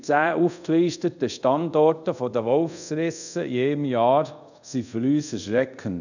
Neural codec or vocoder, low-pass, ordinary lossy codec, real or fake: codec, 24 kHz, 0.5 kbps, DualCodec; 7.2 kHz; none; fake